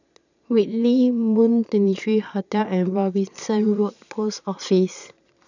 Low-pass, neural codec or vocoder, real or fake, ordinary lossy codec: 7.2 kHz; vocoder, 22.05 kHz, 80 mel bands, Vocos; fake; none